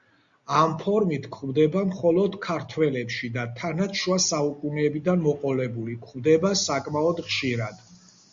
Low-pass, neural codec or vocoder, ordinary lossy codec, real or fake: 7.2 kHz; none; Opus, 64 kbps; real